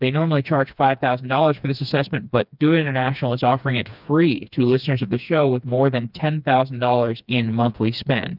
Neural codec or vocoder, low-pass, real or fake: codec, 16 kHz, 2 kbps, FreqCodec, smaller model; 5.4 kHz; fake